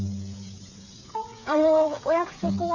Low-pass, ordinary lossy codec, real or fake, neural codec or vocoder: 7.2 kHz; none; fake; codec, 16 kHz, 8 kbps, FreqCodec, smaller model